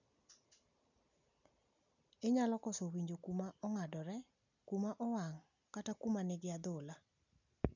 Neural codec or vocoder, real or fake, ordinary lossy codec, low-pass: none; real; none; 7.2 kHz